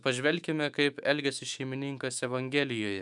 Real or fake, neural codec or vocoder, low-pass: fake; autoencoder, 48 kHz, 128 numbers a frame, DAC-VAE, trained on Japanese speech; 10.8 kHz